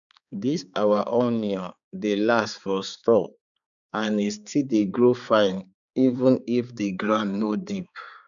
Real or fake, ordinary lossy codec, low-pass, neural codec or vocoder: fake; none; 7.2 kHz; codec, 16 kHz, 4 kbps, X-Codec, HuBERT features, trained on balanced general audio